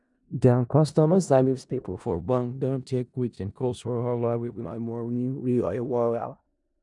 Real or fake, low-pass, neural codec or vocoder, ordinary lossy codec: fake; 10.8 kHz; codec, 16 kHz in and 24 kHz out, 0.4 kbps, LongCat-Audio-Codec, four codebook decoder; none